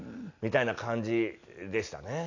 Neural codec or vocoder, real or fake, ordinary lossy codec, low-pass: none; real; none; 7.2 kHz